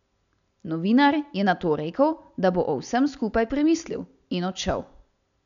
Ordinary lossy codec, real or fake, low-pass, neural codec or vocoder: none; real; 7.2 kHz; none